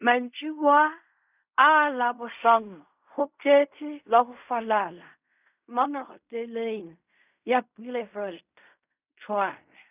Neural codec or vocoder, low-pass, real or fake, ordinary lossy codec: codec, 16 kHz in and 24 kHz out, 0.4 kbps, LongCat-Audio-Codec, fine tuned four codebook decoder; 3.6 kHz; fake; none